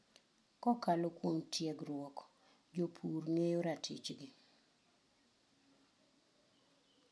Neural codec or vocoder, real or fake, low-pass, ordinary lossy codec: none; real; none; none